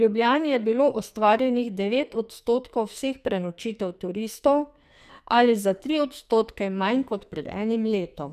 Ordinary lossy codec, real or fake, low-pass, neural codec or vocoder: none; fake; 14.4 kHz; codec, 44.1 kHz, 2.6 kbps, SNAC